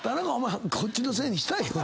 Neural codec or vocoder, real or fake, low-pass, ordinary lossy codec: none; real; none; none